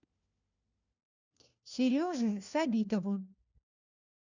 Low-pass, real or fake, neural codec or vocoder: 7.2 kHz; fake; codec, 16 kHz, 1 kbps, FunCodec, trained on LibriTTS, 50 frames a second